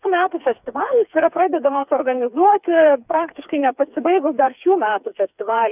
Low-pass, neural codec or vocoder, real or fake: 3.6 kHz; codec, 16 kHz, 4 kbps, FreqCodec, smaller model; fake